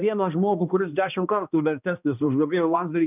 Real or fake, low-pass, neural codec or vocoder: fake; 3.6 kHz; codec, 16 kHz, 1 kbps, X-Codec, HuBERT features, trained on balanced general audio